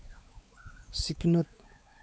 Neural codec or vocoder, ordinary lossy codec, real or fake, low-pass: codec, 16 kHz, 4 kbps, X-Codec, WavLM features, trained on Multilingual LibriSpeech; none; fake; none